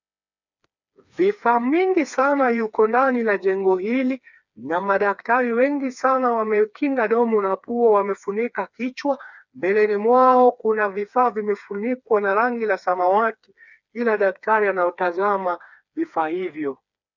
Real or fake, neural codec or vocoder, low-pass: fake; codec, 16 kHz, 4 kbps, FreqCodec, smaller model; 7.2 kHz